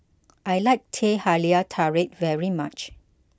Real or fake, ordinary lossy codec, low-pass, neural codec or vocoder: real; none; none; none